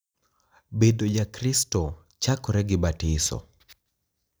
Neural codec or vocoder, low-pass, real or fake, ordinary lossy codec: none; none; real; none